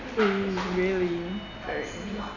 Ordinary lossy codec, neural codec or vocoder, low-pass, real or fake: none; none; 7.2 kHz; real